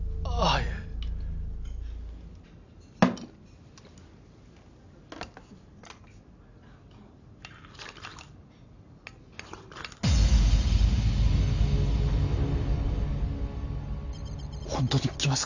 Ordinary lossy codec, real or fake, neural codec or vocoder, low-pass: none; real; none; 7.2 kHz